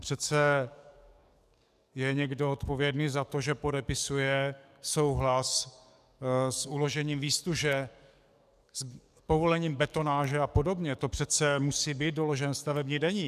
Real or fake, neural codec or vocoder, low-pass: fake; codec, 44.1 kHz, 7.8 kbps, DAC; 14.4 kHz